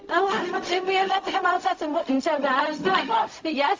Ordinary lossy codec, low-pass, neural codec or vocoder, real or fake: Opus, 32 kbps; 7.2 kHz; codec, 16 kHz, 0.4 kbps, LongCat-Audio-Codec; fake